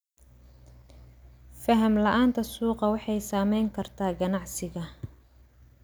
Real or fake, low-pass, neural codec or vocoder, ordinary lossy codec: real; none; none; none